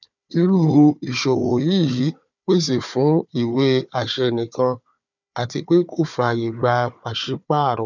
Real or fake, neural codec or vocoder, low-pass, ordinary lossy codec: fake; codec, 16 kHz, 4 kbps, FunCodec, trained on Chinese and English, 50 frames a second; 7.2 kHz; none